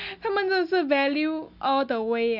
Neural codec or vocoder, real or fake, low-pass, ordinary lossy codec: none; real; 5.4 kHz; none